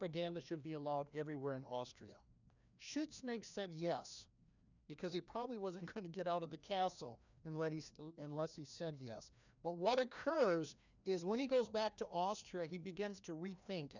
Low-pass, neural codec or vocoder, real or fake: 7.2 kHz; codec, 16 kHz, 1 kbps, FreqCodec, larger model; fake